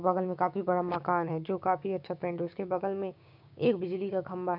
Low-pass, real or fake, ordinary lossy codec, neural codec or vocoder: 5.4 kHz; fake; none; codec, 16 kHz, 6 kbps, DAC